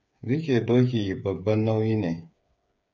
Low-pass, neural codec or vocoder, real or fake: 7.2 kHz; codec, 16 kHz, 8 kbps, FreqCodec, smaller model; fake